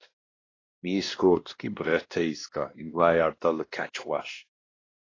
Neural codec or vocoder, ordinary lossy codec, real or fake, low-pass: codec, 16 kHz, 1 kbps, X-Codec, WavLM features, trained on Multilingual LibriSpeech; AAC, 32 kbps; fake; 7.2 kHz